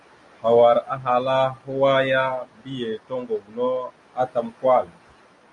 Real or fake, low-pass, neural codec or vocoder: real; 10.8 kHz; none